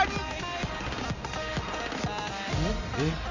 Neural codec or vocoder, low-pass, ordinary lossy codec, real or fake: none; 7.2 kHz; none; real